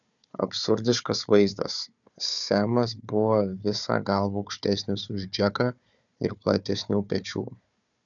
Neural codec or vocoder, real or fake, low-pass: codec, 16 kHz, 4 kbps, FunCodec, trained on Chinese and English, 50 frames a second; fake; 7.2 kHz